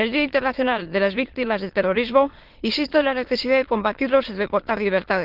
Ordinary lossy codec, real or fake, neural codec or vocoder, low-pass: Opus, 16 kbps; fake; autoencoder, 22.05 kHz, a latent of 192 numbers a frame, VITS, trained on many speakers; 5.4 kHz